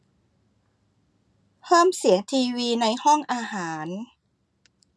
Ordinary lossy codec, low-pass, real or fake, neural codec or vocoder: none; none; real; none